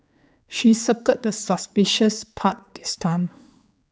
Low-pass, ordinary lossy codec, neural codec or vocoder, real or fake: none; none; codec, 16 kHz, 4 kbps, X-Codec, HuBERT features, trained on general audio; fake